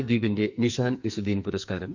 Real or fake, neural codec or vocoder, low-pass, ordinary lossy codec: fake; codec, 44.1 kHz, 2.6 kbps, SNAC; 7.2 kHz; none